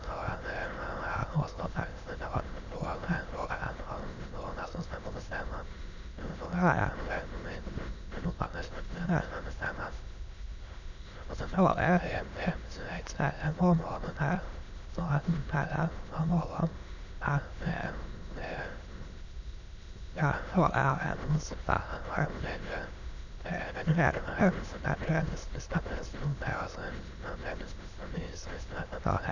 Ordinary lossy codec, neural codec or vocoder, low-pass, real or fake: none; autoencoder, 22.05 kHz, a latent of 192 numbers a frame, VITS, trained on many speakers; 7.2 kHz; fake